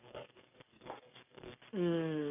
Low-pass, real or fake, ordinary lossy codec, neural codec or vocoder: 3.6 kHz; fake; none; codec, 44.1 kHz, 7.8 kbps, DAC